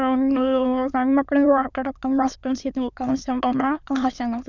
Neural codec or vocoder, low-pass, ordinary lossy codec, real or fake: autoencoder, 22.05 kHz, a latent of 192 numbers a frame, VITS, trained on many speakers; 7.2 kHz; none; fake